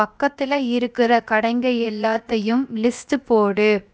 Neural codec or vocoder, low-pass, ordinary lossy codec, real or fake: codec, 16 kHz, 0.7 kbps, FocalCodec; none; none; fake